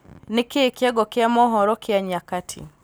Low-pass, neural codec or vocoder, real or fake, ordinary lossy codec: none; none; real; none